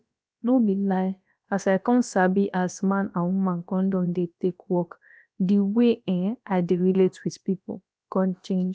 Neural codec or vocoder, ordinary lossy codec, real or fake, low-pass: codec, 16 kHz, about 1 kbps, DyCAST, with the encoder's durations; none; fake; none